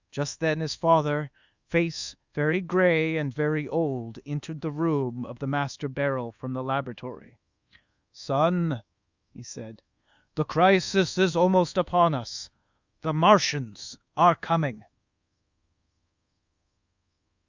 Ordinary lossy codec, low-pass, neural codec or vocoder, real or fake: Opus, 64 kbps; 7.2 kHz; codec, 24 kHz, 1.2 kbps, DualCodec; fake